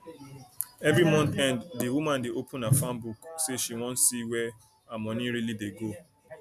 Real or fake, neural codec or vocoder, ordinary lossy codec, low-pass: real; none; none; 14.4 kHz